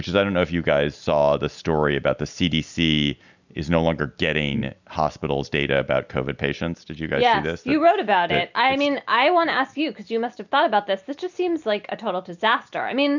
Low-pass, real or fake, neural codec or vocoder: 7.2 kHz; real; none